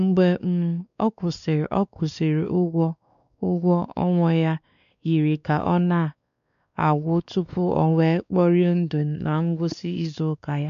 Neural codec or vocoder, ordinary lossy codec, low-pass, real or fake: codec, 16 kHz, 2 kbps, X-Codec, WavLM features, trained on Multilingual LibriSpeech; none; 7.2 kHz; fake